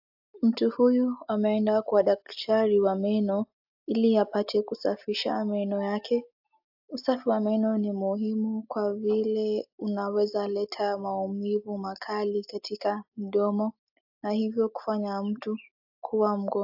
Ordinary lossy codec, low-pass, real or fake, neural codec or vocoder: AAC, 48 kbps; 5.4 kHz; real; none